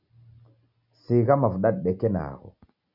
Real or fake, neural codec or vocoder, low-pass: real; none; 5.4 kHz